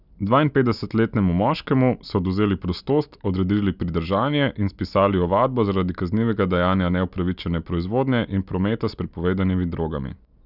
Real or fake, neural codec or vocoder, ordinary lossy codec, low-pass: real; none; none; 5.4 kHz